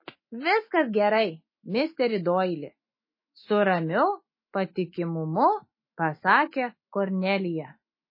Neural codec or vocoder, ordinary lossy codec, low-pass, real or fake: autoencoder, 48 kHz, 128 numbers a frame, DAC-VAE, trained on Japanese speech; MP3, 24 kbps; 5.4 kHz; fake